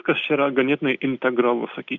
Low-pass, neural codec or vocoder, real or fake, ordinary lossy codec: 7.2 kHz; none; real; Opus, 64 kbps